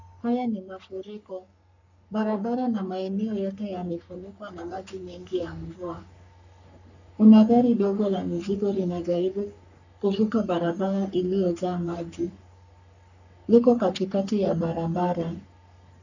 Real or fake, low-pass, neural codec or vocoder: fake; 7.2 kHz; codec, 44.1 kHz, 3.4 kbps, Pupu-Codec